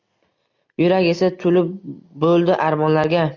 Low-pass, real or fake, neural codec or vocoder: 7.2 kHz; real; none